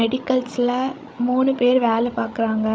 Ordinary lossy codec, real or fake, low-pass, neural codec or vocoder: none; fake; none; codec, 16 kHz, 16 kbps, FreqCodec, larger model